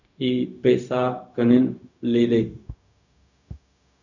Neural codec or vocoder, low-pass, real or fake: codec, 16 kHz, 0.4 kbps, LongCat-Audio-Codec; 7.2 kHz; fake